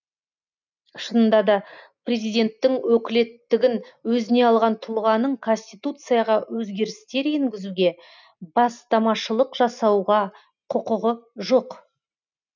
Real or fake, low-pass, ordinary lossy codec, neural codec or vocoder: real; 7.2 kHz; none; none